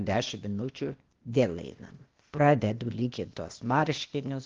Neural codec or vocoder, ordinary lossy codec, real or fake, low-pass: codec, 16 kHz, 0.8 kbps, ZipCodec; Opus, 32 kbps; fake; 7.2 kHz